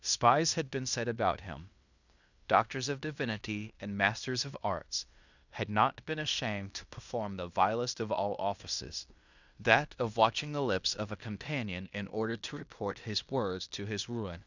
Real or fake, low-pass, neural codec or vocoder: fake; 7.2 kHz; codec, 16 kHz in and 24 kHz out, 0.9 kbps, LongCat-Audio-Codec, fine tuned four codebook decoder